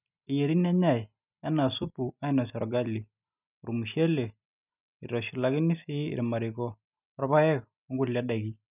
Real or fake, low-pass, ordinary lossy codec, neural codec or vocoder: real; 3.6 kHz; none; none